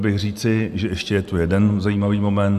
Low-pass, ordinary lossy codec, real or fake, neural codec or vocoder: 14.4 kHz; MP3, 96 kbps; real; none